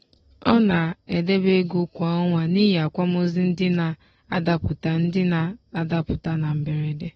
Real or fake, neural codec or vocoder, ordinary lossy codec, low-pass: real; none; AAC, 24 kbps; 14.4 kHz